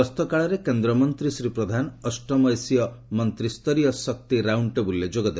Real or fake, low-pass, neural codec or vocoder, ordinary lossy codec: real; none; none; none